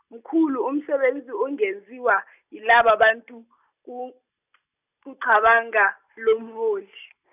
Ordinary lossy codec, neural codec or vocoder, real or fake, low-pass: none; none; real; 3.6 kHz